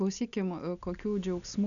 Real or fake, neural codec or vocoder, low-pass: real; none; 7.2 kHz